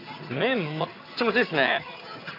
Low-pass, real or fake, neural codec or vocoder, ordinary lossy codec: 5.4 kHz; fake; vocoder, 22.05 kHz, 80 mel bands, HiFi-GAN; AAC, 32 kbps